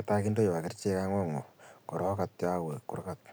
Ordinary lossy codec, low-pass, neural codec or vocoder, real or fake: none; none; none; real